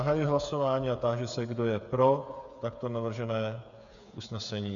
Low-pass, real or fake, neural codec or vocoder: 7.2 kHz; fake; codec, 16 kHz, 8 kbps, FreqCodec, smaller model